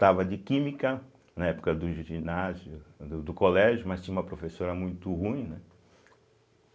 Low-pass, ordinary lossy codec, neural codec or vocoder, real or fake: none; none; none; real